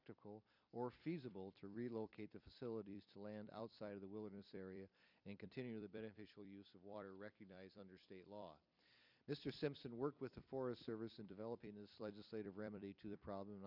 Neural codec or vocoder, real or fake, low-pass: vocoder, 44.1 kHz, 128 mel bands every 256 samples, BigVGAN v2; fake; 5.4 kHz